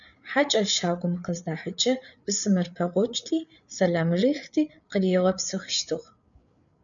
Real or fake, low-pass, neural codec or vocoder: fake; 7.2 kHz; codec, 16 kHz, 8 kbps, FreqCodec, larger model